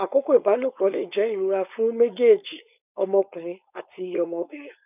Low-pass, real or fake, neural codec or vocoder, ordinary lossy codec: 3.6 kHz; fake; codec, 16 kHz, 4.8 kbps, FACodec; none